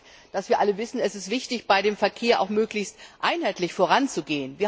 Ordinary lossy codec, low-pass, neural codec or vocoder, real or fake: none; none; none; real